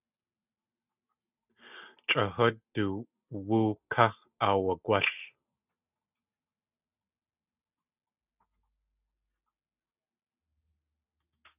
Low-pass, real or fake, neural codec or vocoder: 3.6 kHz; real; none